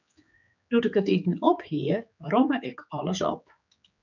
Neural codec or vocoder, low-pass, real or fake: codec, 16 kHz, 4 kbps, X-Codec, HuBERT features, trained on general audio; 7.2 kHz; fake